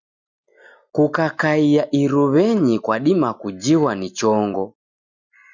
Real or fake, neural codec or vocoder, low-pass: real; none; 7.2 kHz